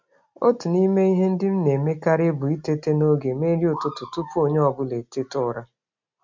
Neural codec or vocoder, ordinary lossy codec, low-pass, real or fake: none; MP3, 48 kbps; 7.2 kHz; real